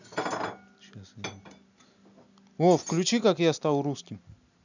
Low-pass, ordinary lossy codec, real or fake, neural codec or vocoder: 7.2 kHz; none; real; none